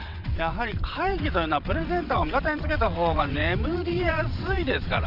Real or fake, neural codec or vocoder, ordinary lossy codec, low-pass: fake; vocoder, 22.05 kHz, 80 mel bands, WaveNeXt; none; 5.4 kHz